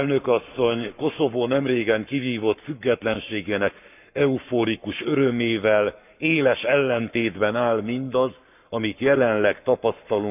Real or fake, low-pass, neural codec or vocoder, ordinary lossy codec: fake; 3.6 kHz; codec, 44.1 kHz, 7.8 kbps, Pupu-Codec; none